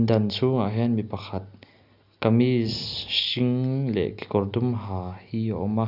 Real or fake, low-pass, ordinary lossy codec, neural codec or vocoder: real; 5.4 kHz; none; none